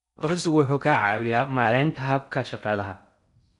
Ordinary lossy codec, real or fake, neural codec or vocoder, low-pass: none; fake; codec, 16 kHz in and 24 kHz out, 0.6 kbps, FocalCodec, streaming, 4096 codes; 10.8 kHz